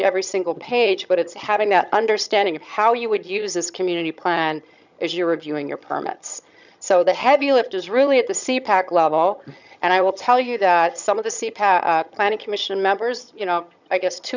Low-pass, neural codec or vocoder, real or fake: 7.2 kHz; vocoder, 22.05 kHz, 80 mel bands, HiFi-GAN; fake